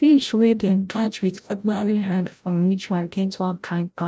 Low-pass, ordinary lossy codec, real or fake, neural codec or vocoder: none; none; fake; codec, 16 kHz, 0.5 kbps, FreqCodec, larger model